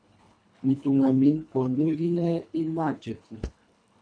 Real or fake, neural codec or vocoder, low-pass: fake; codec, 24 kHz, 1.5 kbps, HILCodec; 9.9 kHz